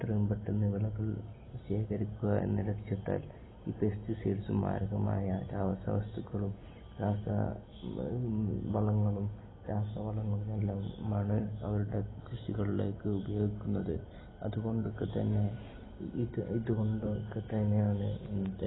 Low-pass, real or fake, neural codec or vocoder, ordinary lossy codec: 7.2 kHz; real; none; AAC, 16 kbps